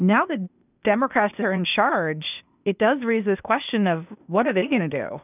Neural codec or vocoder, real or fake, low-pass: codec, 16 kHz, 0.8 kbps, ZipCodec; fake; 3.6 kHz